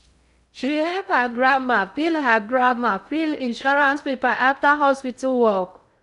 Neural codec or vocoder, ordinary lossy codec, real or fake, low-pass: codec, 16 kHz in and 24 kHz out, 0.6 kbps, FocalCodec, streaming, 2048 codes; none; fake; 10.8 kHz